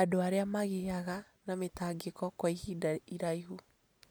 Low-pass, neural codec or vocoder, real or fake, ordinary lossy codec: none; none; real; none